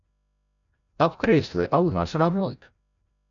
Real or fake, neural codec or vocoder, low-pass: fake; codec, 16 kHz, 0.5 kbps, FreqCodec, larger model; 7.2 kHz